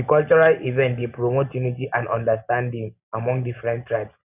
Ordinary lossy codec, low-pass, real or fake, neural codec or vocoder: none; 3.6 kHz; real; none